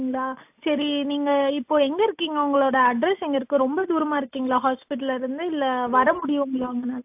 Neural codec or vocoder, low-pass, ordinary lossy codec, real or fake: none; 3.6 kHz; AAC, 32 kbps; real